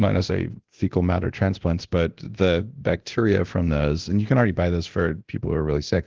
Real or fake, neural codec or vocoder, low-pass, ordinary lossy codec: fake; codec, 24 kHz, 0.9 kbps, DualCodec; 7.2 kHz; Opus, 16 kbps